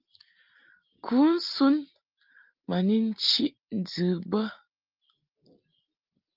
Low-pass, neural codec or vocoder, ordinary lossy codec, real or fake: 5.4 kHz; none; Opus, 32 kbps; real